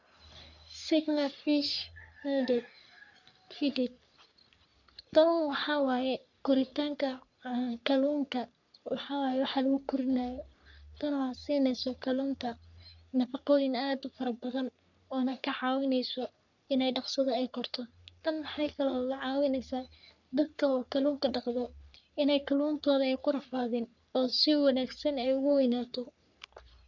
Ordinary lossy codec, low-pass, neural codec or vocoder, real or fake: none; 7.2 kHz; codec, 44.1 kHz, 3.4 kbps, Pupu-Codec; fake